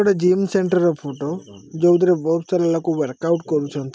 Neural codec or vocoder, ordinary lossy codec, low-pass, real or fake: none; none; none; real